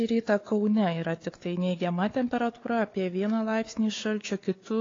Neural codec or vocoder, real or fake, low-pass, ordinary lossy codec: codec, 16 kHz, 4 kbps, FunCodec, trained on Chinese and English, 50 frames a second; fake; 7.2 kHz; AAC, 32 kbps